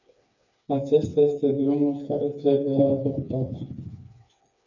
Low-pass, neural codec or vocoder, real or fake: 7.2 kHz; codec, 16 kHz, 4 kbps, FreqCodec, smaller model; fake